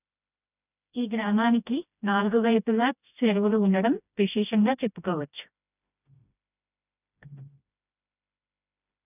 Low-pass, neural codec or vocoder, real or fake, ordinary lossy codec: 3.6 kHz; codec, 16 kHz, 1 kbps, FreqCodec, smaller model; fake; none